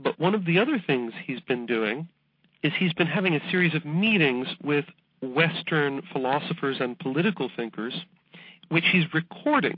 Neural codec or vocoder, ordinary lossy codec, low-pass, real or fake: none; MP3, 32 kbps; 5.4 kHz; real